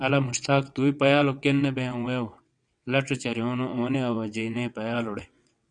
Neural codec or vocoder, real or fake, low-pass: vocoder, 22.05 kHz, 80 mel bands, WaveNeXt; fake; 9.9 kHz